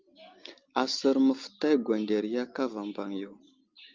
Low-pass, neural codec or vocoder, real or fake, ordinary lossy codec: 7.2 kHz; none; real; Opus, 24 kbps